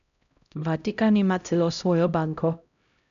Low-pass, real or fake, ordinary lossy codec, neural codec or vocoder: 7.2 kHz; fake; none; codec, 16 kHz, 0.5 kbps, X-Codec, HuBERT features, trained on LibriSpeech